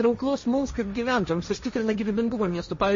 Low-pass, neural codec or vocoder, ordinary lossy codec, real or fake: 7.2 kHz; codec, 16 kHz, 1.1 kbps, Voila-Tokenizer; MP3, 32 kbps; fake